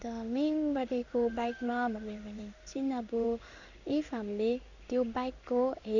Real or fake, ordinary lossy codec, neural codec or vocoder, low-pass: fake; none; codec, 16 kHz in and 24 kHz out, 1 kbps, XY-Tokenizer; 7.2 kHz